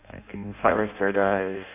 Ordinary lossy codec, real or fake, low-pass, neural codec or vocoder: AAC, 32 kbps; fake; 3.6 kHz; codec, 16 kHz in and 24 kHz out, 0.6 kbps, FireRedTTS-2 codec